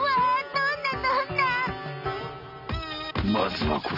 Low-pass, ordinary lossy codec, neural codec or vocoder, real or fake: 5.4 kHz; none; none; real